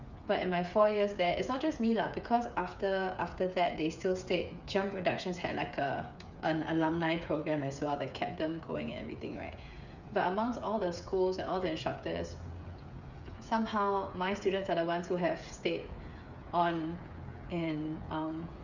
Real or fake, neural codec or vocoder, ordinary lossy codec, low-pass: fake; codec, 16 kHz, 8 kbps, FreqCodec, smaller model; none; 7.2 kHz